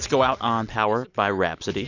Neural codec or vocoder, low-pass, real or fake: none; 7.2 kHz; real